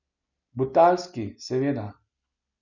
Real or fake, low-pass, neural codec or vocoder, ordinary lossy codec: real; 7.2 kHz; none; none